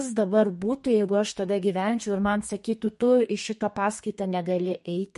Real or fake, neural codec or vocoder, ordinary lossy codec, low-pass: fake; codec, 32 kHz, 1.9 kbps, SNAC; MP3, 48 kbps; 14.4 kHz